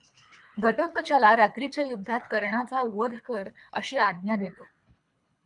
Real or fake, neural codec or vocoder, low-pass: fake; codec, 24 kHz, 3 kbps, HILCodec; 10.8 kHz